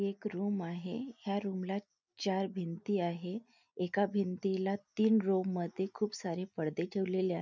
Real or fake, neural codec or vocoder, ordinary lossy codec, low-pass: real; none; none; 7.2 kHz